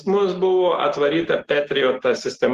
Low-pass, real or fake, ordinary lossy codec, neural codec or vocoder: 14.4 kHz; real; Opus, 16 kbps; none